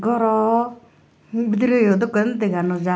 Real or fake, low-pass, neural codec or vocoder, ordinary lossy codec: real; none; none; none